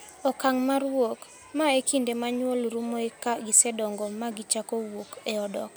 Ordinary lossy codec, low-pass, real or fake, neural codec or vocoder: none; none; real; none